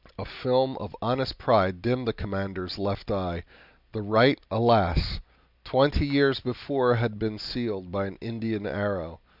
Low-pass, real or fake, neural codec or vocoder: 5.4 kHz; real; none